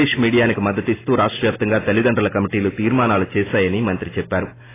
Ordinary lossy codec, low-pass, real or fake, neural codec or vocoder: AAC, 16 kbps; 3.6 kHz; real; none